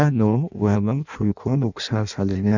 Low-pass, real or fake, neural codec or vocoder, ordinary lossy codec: 7.2 kHz; fake; codec, 16 kHz in and 24 kHz out, 0.6 kbps, FireRedTTS-2 codec; none